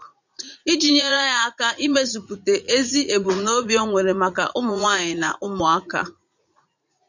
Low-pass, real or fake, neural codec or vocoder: 7.2 kHz; fake; vocoder, 44.1 kHz, 80 mel bands, Vocos